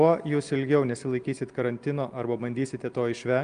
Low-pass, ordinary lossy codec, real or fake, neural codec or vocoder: 9.9 kHz; Opus, 32 kbps; real; none